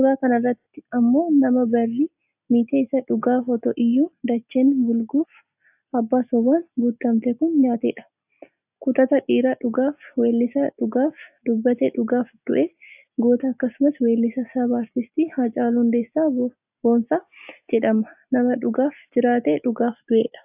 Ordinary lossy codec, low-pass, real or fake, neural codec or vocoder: AAC, 32 kbps; 3.6 kHz; real; none